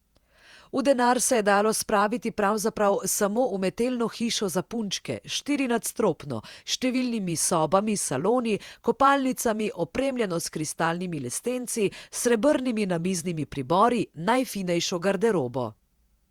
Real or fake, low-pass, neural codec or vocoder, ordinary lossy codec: fake; 19.8 kHz; vocoder, 48 kHz, 128 mel bands, Vocos; Opus, 64 kbps